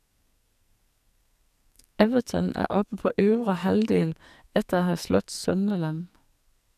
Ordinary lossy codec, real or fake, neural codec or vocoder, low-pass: none; fake; codec, 44.1 kHz, 2.6 kbps, SNAC; 14.4 kHz